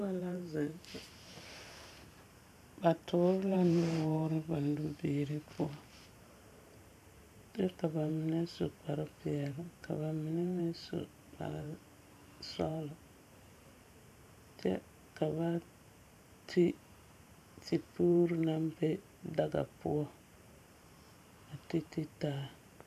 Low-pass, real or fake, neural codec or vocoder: 14.4 kHz; fake; vocoder, 44.1 kHz, 128 mel bands every 512 samples, BigVGAN v2